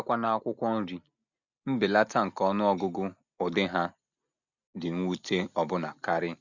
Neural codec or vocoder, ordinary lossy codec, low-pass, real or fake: none; none; 7.2 kHz; real